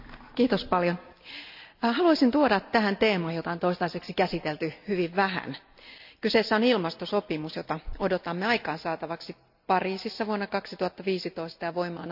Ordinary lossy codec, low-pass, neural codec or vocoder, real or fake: AAC, 48 kbps; 5.4 kHz; none; real